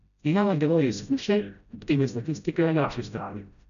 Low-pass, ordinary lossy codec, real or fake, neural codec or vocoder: 7.2 kHz; none; fake; codec, 16 kHz, 0.5 kbps, FreqCodec, smaller model